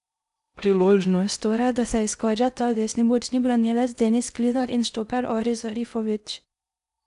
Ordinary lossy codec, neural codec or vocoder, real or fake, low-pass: none; codec, 16 kHz in and 24 kHz out, 0.6 kbps, FocalCodec, streaming, 2048 codes; fake; 10.8 kHz